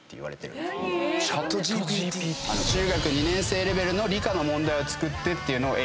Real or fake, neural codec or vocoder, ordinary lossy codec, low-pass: real; none; none; none